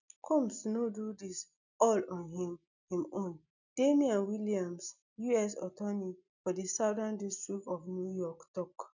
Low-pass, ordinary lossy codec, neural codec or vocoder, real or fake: 7.2 kHz; none; none; real